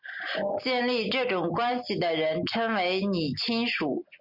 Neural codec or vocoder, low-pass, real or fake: none; 5.4 kHz; real